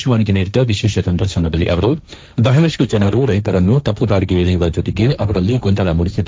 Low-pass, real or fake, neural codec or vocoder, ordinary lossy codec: none; fake; codec, 16 kHz, 1.1 kbps, Voila-Tokenizer; none